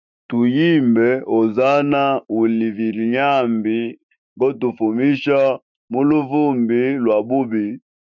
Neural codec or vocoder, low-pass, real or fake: autoencoder, 48 kHz, 128 numbers a frame, DAC-VAE, trained on Japanese speech; 7.2 kHz; fake